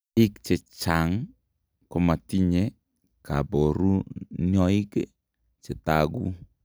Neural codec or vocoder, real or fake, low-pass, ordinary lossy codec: none; real; none; none